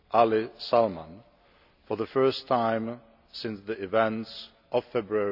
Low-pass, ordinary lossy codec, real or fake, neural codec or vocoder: 5.4 kHz; none; real; none